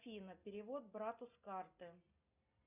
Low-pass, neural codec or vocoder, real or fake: 3.6 kHz; none; real